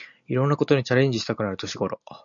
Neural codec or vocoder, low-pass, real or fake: none; 7.2 kHz; real